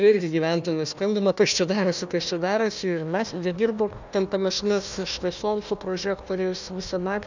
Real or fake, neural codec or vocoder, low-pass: fake; codec, 16 kHz, 1 kbps, FunCodec, trained on Chinese and English, 50 frames a second; 7.2 kHz